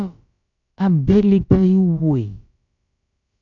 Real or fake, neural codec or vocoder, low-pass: fake; codec, 16 kHz, about 1 kbps, DyCAST, with the encoder's durations; 7.2 kHz